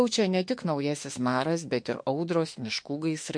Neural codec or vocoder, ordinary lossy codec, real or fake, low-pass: autoencoder, 48 kHz, 32 numbers a frame, DAC-VAE, trained on Japanese speech; MP3, 48 kbps; fake; 9.9 kHz